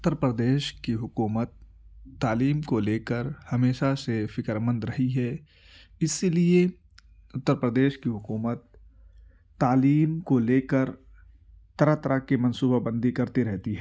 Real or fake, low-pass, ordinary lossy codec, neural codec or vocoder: real; none; none; none